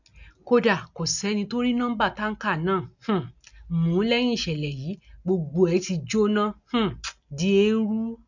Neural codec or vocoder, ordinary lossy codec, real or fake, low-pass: none; none; real; 7.2 kHz